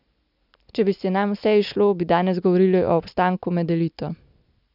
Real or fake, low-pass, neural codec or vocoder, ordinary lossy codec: real; 5.4 kHz; none; none